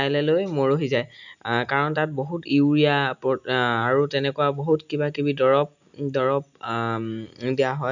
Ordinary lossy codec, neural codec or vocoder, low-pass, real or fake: none; none; 7.2 kHz; real